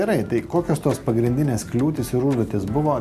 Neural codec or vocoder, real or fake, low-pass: none; real; 14.4 kHz